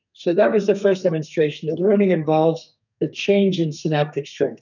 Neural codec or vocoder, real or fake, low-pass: codec, 32 kHz, 1.9 kbps, SNAC; fake; 7.2 kHz